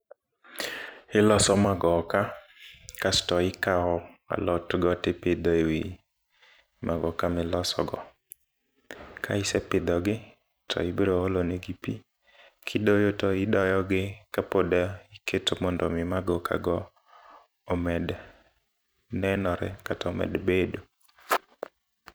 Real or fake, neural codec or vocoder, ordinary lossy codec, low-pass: fake; vocoder, 44.1 kHz, 128 mel bands every 256 samples, BigVGAN v2; none; none